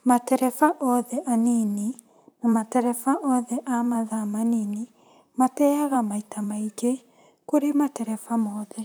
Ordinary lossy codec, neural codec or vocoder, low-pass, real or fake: none; vocoder, 44.1 kHz, 128 mel bands, Pupu-Vocoder; none; fake